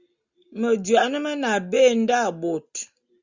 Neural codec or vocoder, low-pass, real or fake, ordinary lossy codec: none; 7.2 kHz; real; Opus, 64 kbps